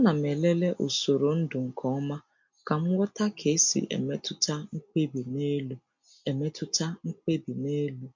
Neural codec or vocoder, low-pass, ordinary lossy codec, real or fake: none; 7.2 kHz; MP3, 48 kbps; real